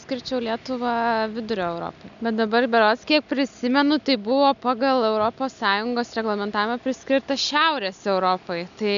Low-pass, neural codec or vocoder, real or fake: 7.2 kHz; none; real